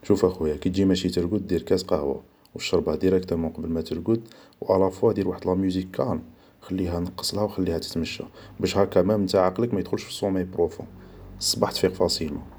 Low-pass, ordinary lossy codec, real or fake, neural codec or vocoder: none; none; real; none